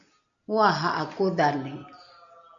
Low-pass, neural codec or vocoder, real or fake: 7.2 kHz; none; real